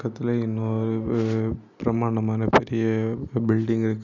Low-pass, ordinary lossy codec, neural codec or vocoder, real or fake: 7.2 kHz; none; none; real